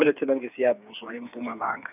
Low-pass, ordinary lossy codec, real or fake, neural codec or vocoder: 3.6 kHz; none; fake; codec, 16 kHz, 4 kbps, FreqCodec, smaller model